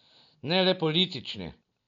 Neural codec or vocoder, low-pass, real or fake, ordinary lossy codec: none; 7.2 kHz; real; none